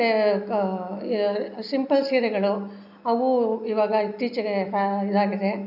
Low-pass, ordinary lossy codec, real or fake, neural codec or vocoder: 5.4 kHz; none; real; none